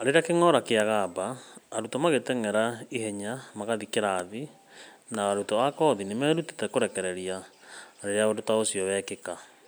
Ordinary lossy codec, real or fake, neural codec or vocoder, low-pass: none; real; none; none